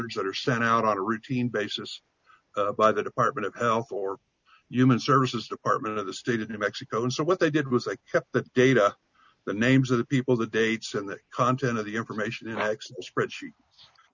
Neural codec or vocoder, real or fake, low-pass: none; real; 7.2 kHz